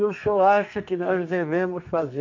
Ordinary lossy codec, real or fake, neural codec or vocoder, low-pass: MP3, 48 kbps; fake; codec, 32 kHz, 1.9 kbps, SNAC; 7.2 kHz